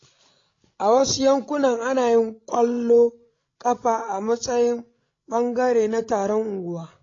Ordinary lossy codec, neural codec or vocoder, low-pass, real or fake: AAC, 32 kbps; codec, 16 kHz, 16 kbps, FreqCodec, larger model; 7.2 kHz; fake